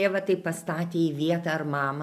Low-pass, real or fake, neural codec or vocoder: 14.4 kHz; real; none